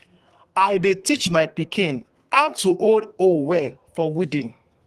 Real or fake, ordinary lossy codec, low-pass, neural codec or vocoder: fake; Opus, 24 kbps; 14.4 kHz; codec, 32 kHz, 1.9 kbps, SNAC